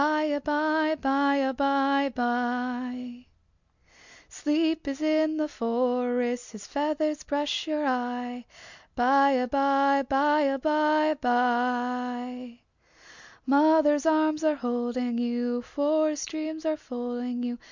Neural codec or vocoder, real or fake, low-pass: none; real; 7.2 kHz